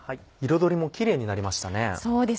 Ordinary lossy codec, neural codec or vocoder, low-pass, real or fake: none; none; none; real